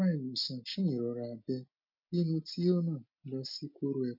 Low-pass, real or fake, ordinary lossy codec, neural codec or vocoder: 5.4 kHz; real; MP3, 32 kbps; none